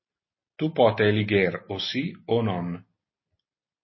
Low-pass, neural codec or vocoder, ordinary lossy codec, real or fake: 7.2 kHz; none; MP3, 24 kbps; real